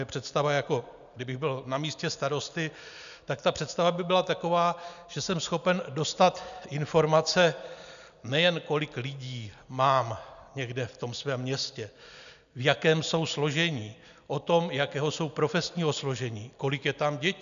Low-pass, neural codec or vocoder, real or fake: 7.2 kHz; none; real